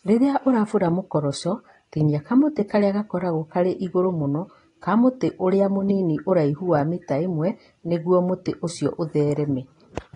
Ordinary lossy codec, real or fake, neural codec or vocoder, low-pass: AAC, 32 kbps; real; none; 10.8 kHz